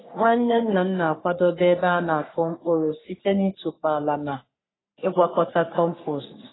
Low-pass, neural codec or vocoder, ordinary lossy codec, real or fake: 7.2 kHz; codec, 44.1 kHz, 3.4 kbps, Pupu-Codec; AAC, 16 kbps; fake